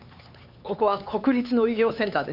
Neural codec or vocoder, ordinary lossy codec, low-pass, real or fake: codec, 16 kHz, 4 kbps, X-Codec, HuBERT features, trained on LibriSpeech; none; 5.4 kHz; fake